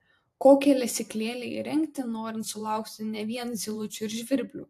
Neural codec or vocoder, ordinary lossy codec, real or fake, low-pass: vocoder, 44.1 kHz, 128 mel bands every 512 samples, BigVGAN v2; AAC, 64 kbps; fake; 14.4 kHz